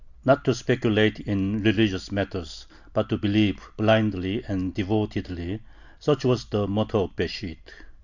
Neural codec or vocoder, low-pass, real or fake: none; 7.2 kHz; real